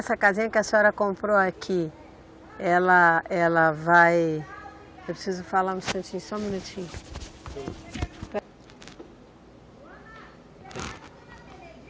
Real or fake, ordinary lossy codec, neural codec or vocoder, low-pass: real; none; none; none